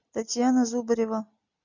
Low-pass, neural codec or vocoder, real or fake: 7.2 kHz; none; real